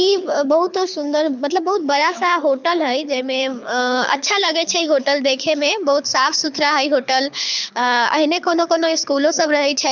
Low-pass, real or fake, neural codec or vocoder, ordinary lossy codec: 7.2 kHz; fake; codec, 24 kHz, 6 kbps, HILCodec; none